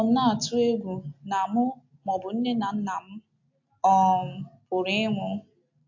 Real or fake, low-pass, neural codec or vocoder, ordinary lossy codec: real; 7.2 kHz; none; AAC, 48 kbps